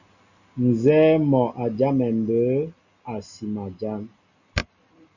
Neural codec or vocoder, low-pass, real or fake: none; 7.2 kHz; real